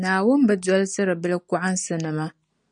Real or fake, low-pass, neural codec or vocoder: real; 9.9 kHz; none